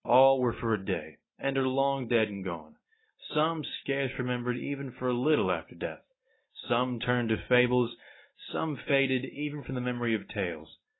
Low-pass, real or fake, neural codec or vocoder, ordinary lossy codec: 7.2 kHz; real; none; AAC, 16 kbps